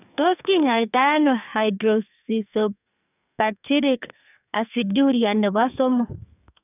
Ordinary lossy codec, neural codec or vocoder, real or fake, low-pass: none; codec, 44.1 kHz, 2.6 kbps, SNAC; fake; 3.6 kHz